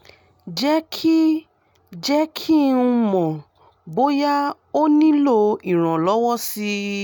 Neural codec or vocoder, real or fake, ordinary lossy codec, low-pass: none; real; none; 19.8 kHz